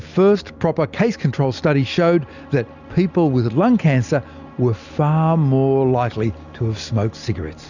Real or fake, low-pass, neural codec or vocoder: real; 7.2 kHz; none